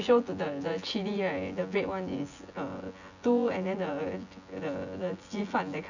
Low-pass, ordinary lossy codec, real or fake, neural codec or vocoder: 7.2 kHz; none; fake; vocoder, 24 kHz, 100 mel bands, Vocos